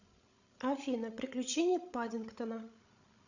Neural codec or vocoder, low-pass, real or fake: codec, 16 kHz, 16 kbps, FreqCodec, larger model; 7.2 kHz; fake